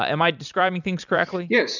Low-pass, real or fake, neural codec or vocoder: 7.2 kHz; fake; vocoder, 44.1 kHz, 128 mel bands every 256 samples, BigVGAN v2